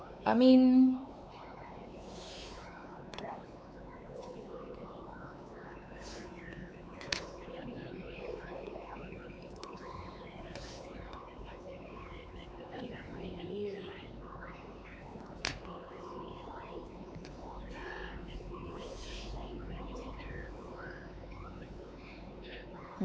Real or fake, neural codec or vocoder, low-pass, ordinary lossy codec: fake; codec, 16 kHz, 4 kbps, X-Codec, WavLM features, trained on Multilingual LibriSpeech; none; none